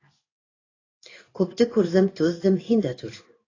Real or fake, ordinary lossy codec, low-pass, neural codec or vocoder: fake; AAC, 32 kbps; 7.2 kHz; codec, 16 kHz in and 24 kHz out, 1 kbps, XY-Tokenizer